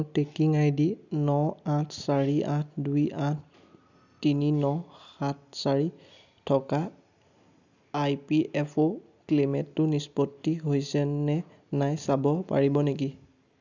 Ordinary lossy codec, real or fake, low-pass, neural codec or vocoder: none; real; 7.2 kHz; none